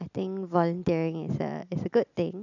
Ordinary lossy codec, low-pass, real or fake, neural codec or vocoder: none; 7.2 kHz; real; none